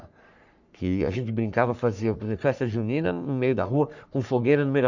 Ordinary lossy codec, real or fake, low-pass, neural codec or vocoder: none; fake; 7.2 kHz; codec, 44.1 kHz, 3.4 kbps, Pupu-Codec